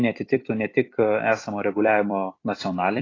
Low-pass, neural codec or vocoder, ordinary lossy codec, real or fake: 7.2 kHz; vocoder, 44.1 kHz, 128 mel bands every 256 samples, BigVGAN v2; AAC, 32 kbps; fake